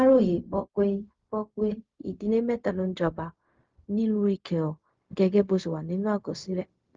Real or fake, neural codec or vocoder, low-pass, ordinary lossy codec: fake; codec, 16 kHz, 0.4 kbps, LongCat-Audio-Codec; 7.2 kHz; Opus, 32 kbps